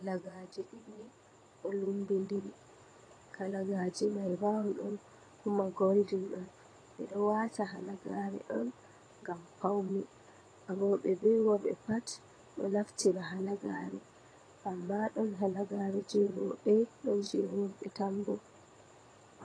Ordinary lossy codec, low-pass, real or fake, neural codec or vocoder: AAC, 32 kbps; 9.9 kHz; fake; vocoder, 22.05 kHz, 80 mel bands, Vocos